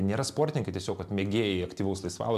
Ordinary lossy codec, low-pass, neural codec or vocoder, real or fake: MP3, 96 kbps; 14.4 kHz; none; real